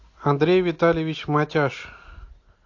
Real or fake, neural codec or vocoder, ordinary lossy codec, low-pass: real; none; AAC, 48 kbps; 7.2 kHz